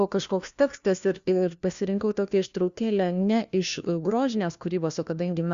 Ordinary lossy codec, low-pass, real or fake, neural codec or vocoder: Opus, 64 kbps; 7.2 kHz; fake; codec, 16 kHz, 1 kbps, FunCodec, trained on LibriTTS, 50 frames a second